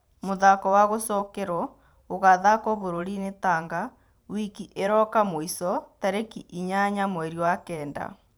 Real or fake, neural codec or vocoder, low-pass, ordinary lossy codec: fake; vocoder, 44.1 kHz, 128 mel bands every 512 samples, BigVGAN v2; none; none